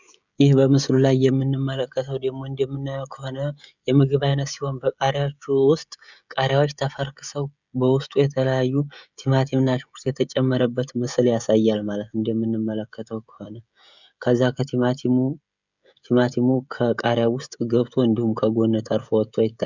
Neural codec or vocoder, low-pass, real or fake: codec, 16 kHz, 16 kbps, FreqCodec, smaller model; 7.2 kHz; fake